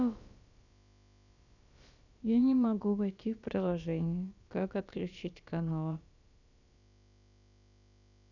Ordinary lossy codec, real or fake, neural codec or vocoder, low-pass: none; fake; codec, 16 kHz, about 1 kbps, DyCAST, with the encoder's durations; 7.2 kHz